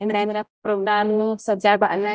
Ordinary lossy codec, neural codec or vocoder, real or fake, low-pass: none; codec, 16 kHz, 0.5 kbps, X-Codec, HuBERT features, trained on general audio; fake; none